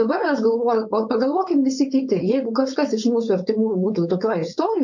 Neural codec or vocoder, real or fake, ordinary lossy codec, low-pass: codec, 16 kHz, 4.8 kbps, FACodec; fake; MP3, 48 kbps; 7.2 kHz